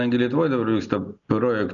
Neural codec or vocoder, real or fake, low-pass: none; real; 7.2 kHz